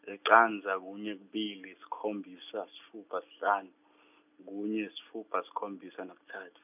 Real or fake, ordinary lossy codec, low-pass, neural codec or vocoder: real; none; 3.6 kHz; none